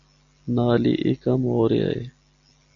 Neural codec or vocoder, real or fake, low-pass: none; real; 7.2 kHz